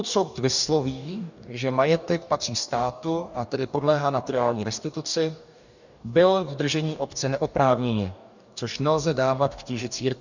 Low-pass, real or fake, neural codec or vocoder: 7.2 kHz; fake; codec, 44.1 kHz, 2.6 kbps, DAC